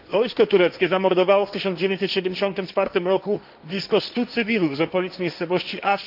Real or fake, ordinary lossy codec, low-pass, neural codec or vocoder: fake; none; 5.4 kHz; codec, 16 kHz, 1.1 kbps, Voila-Tokenizer